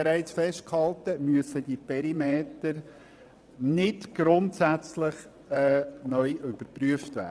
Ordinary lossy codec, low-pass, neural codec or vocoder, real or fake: none; none; vocoder, 22.05 kHz, 80 mel bands, WaveNeXt; fake